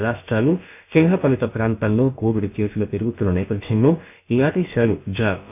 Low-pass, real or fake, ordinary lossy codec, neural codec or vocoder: 3.6 kHz; fake; none; codec, 16 kHz, about 1 kbps, DyCAST, with the encoder's durations